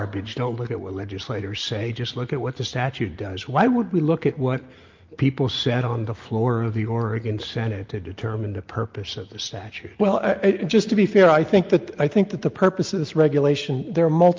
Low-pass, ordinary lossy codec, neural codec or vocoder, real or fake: 7.2 kHz; Opus, 24 kbps; none; real